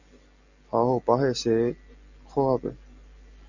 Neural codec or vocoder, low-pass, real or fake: none; 7.2 kHz; real